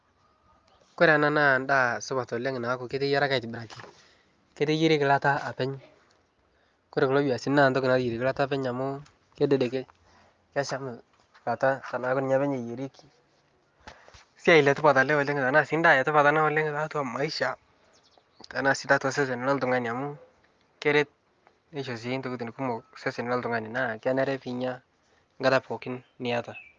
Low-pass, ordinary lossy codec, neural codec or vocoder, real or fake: 7.2 kHz; Opus, 32 kbps; none; real